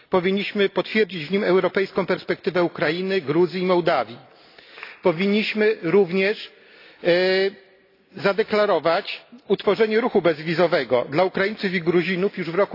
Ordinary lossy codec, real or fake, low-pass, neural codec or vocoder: AAC, 32 kbps; real; 5.4 kHz; none